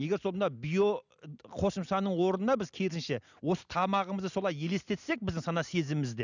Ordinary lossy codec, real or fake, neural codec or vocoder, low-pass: none; real; none; 7.2 kHz